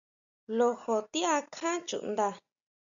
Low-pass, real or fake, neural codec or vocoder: 7.2 kHz; real; none